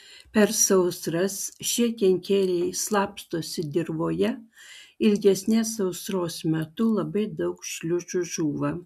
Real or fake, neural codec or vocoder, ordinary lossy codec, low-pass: real; none; MP3, 96 kbps; 14.4 kHz